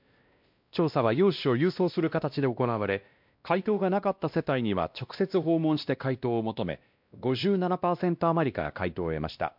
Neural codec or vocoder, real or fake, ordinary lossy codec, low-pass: codec, 16 kHz, 1 kbps, X-Codec, WavLM features, trained on Multilingual LibriSpeech; fake; MP3, 48 kbps; 5.4 kHz